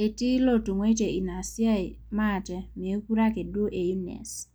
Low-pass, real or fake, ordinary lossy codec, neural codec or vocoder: none; real; none; none